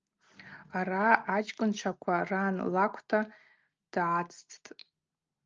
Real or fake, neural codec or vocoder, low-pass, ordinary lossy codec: real; none; 7.2 kHz; Opus, 24 kbps